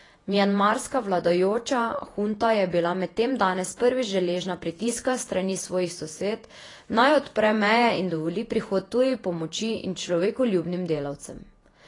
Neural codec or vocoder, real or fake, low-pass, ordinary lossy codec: vocoder, 48 kHz, 128 mel bands, Vocos; fake; 10.8 kHz; AAC, 32 kbps